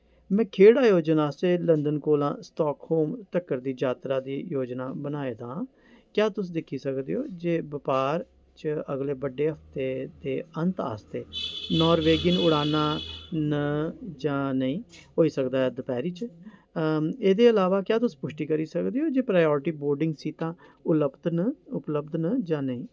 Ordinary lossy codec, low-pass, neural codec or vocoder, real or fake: none; none; none; real